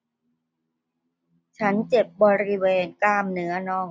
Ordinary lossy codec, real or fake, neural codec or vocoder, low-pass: none; real; none; none